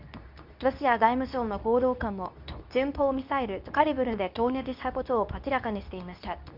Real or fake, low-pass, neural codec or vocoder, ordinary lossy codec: fake; 5.4 kHz; codec, 24 kHz, 0.9 kbps, WavTokenizer, medium speech release version 2; none